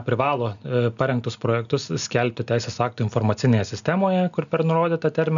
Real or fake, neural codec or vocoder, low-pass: real; none; 7.2 kHz